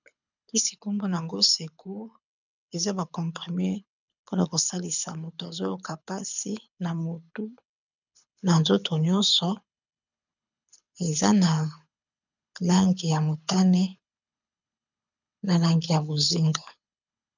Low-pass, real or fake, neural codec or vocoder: 7.2 kHz; fake; codec, 24 kHz, 6 kbps, HILCodec